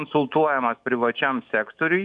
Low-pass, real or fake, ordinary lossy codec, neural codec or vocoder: 10.8 kHz; fake; MP3, 96 kbps; autoencoder, 48 kHz, 128 numbers a frame, DAC-VAE, trained on Japanese speech